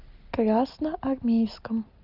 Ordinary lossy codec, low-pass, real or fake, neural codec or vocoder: Opus, 24 kbps; 5.4 kHz; real; none